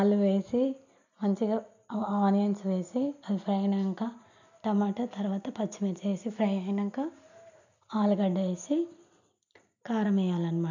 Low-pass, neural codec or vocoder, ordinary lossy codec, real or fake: 7.2 kHz; none; none; real